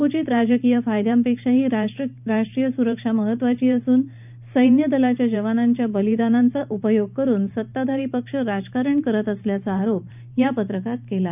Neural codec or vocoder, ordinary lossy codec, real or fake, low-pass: vocoder, 44.1 kHz, 128 mel bands every 512 samples, BigVGAN v2; none; fake; 3.6 kHz